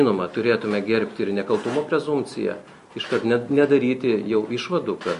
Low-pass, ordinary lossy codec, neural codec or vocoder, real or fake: 10.8 kHz; MP3, 48 kbps; none; real